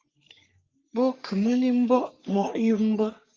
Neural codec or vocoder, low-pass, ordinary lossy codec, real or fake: codec, 44.1 kHz, 3.4 kbps, Pupu-Codec; 7.2 kHz; Opus, 24 kbps; fake